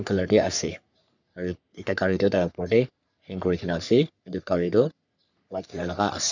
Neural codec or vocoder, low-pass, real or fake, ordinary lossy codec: codec, 44.1 kHz, 3.4 kbps, Pupu-Codec; 7.2 kHz; fake; none